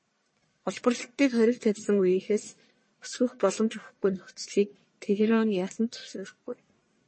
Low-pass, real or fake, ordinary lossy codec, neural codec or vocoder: 9.9 kHz; fake; MP3, 32 kbps; codec, 44.1 kHz, 1.7 kbps, Pupu-Codec